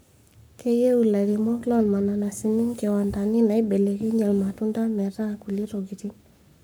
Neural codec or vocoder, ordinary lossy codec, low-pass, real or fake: codec, 44.1 kHz, 7.8 kbps, Pupu-Codec; none; none; fake